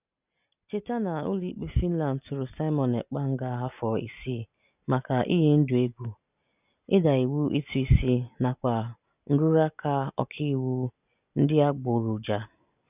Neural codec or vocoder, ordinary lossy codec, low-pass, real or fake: none; none; 3.6 kHz; real